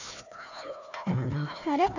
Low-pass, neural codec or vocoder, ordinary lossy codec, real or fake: 7.2 kHz; codec, 16 kHz, 1 kbps, FunCodec, trained on Chinese and English, 50 frames a second; none; fake